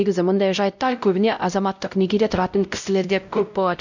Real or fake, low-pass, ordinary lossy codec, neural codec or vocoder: fake; 7.2 kHz; none; codec, 16 kHz, 0.5 kbps, X-Codec, WavLM features, trained on Multilingual LibriSpeech